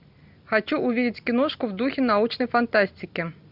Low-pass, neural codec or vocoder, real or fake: 5.4 kHz; none; real